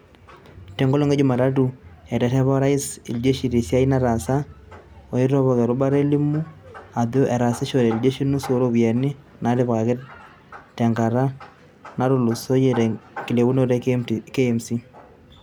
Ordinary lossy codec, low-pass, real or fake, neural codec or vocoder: none; none; real; none